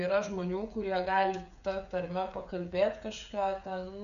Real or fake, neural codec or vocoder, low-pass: fake; codec, 16 kHz, 8 kbps, FreqCodec, smaller model; 7.2 kHz